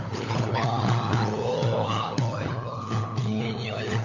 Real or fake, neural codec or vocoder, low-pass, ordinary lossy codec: fake; codec, 16 kHz, 16 kbps, FunCodec, trained on LibriTTS, 50 frames a second; 7.2 kHz; none